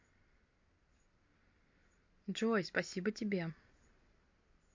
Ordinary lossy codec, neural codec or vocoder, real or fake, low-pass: MP3, 48 kbps; none; real; 7.2 kHz